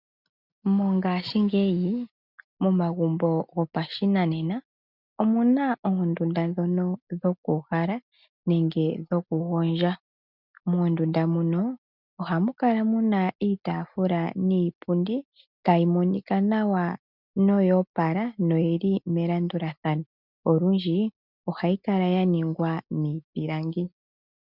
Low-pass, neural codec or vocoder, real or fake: 5.4 kHz; none; real